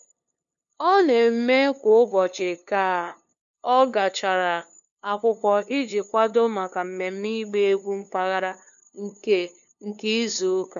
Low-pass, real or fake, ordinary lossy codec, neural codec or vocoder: 7.2 kHz; fake; none; codec, 16 kHz, 2 kbps, FunCodec, trained on LibriTTS, 25 frames a second